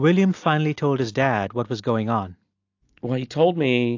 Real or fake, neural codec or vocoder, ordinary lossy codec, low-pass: real; none; AAC, 48 kbps; 7.2 kHz